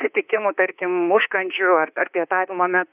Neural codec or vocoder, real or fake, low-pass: autoencoder, 48 kHz, 32 numbers a frame, DAC-VAE, trained on Japanese speech; fake; 3.6 kHz